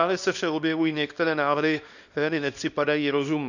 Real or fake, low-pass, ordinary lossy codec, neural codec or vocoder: fake; 7.2 kHz; none; codec, 24 kHz, 0.9 kbps, WavTokenizer, small release